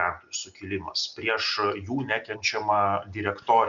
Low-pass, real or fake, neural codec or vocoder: 7.2 kHz; real; none